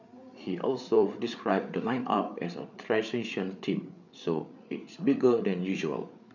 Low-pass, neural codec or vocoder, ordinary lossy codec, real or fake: 7.2 kHz; codec, 16 kHz, 8 kbps, FreqCodec, larger model; none; fake